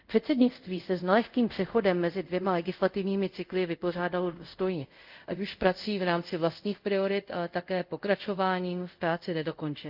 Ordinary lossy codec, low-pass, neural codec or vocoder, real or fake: Opus, 24 kbps; 5.4 kHz; codec, 24 kHz, 0.5 kbps, DualCodec; fake